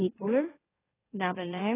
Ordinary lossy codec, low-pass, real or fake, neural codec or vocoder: AAC, 16 kbps; 3.6 kHz; fake; autoencoder, 44.1 kHz, a latent of 192 numbers a frame, MeloTTS